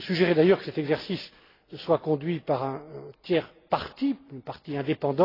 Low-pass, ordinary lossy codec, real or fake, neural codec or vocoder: 5.4 kHz; AAC, 24 kbps; real; none